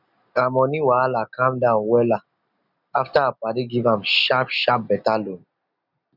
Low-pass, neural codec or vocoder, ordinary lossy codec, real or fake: 5.4 kHz; none; none; real